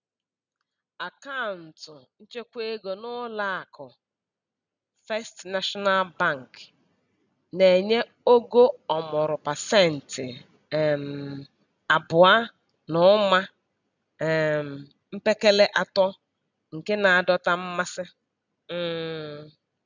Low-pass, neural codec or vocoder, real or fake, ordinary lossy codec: 7.2 kHz; none; real; none